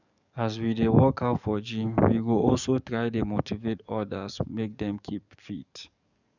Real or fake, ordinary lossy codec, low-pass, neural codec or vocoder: fake; none; 7.2 kHz; codec, 44.1 kHz, 7.8 kbps, DAC